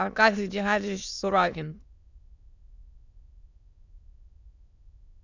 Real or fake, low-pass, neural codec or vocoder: fake; 7.2 kHz; autoencoder, 22.05 kHz, a latent of 192 numbers a frame, VITS, trained on many speakers